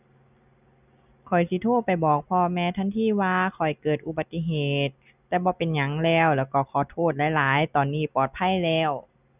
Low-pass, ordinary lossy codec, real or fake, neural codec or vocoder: 3.6 kHz; none; real; none